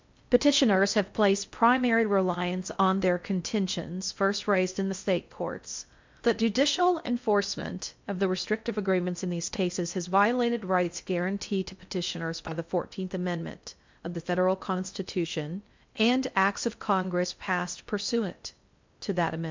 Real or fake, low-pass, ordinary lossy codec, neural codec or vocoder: fake; 7.2 kHz; MP3, 64 kbps; codec, 16 kHz in and 24 kHz out, 0.6 kbps, FocalCodec, streaming, 4096 codes